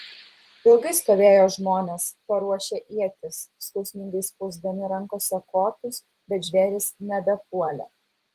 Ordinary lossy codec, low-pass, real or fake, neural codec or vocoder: Opus, 24 kbps; 14.4 kHz; fake; vocoder, 44.1 kHz, 128 mel bands every 256 samples, BigVGAN v2